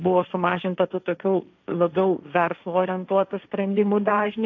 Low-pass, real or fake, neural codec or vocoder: 7.2 kHz; fake; codec, 16 kHz, 1.1 kbps, Voila-Tokenizer